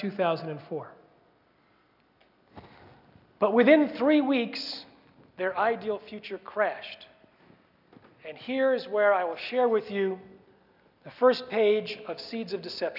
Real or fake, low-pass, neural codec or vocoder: real; 5.4 kHz; none